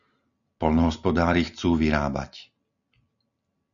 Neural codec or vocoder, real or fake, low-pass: none; real; 7.2 kHz